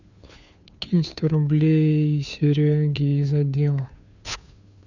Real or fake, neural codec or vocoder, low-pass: fake; codec, 16 kHz, 2 kbps, FunCodec, trained on Chinese and English, 25 frames a second; 7.2 kHz